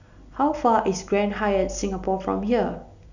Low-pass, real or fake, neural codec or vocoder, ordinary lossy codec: 7.2 kHz; real; none; none